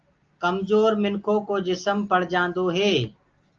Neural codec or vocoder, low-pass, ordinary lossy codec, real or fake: none; 7.2 kHz; Opus, 24 kbps; real